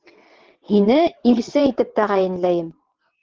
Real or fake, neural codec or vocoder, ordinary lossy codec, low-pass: fake; vocoder, 22.05 kHz, 80 mel bands, WaveNeXt; Opus, 16 kbps; 7.2 kHz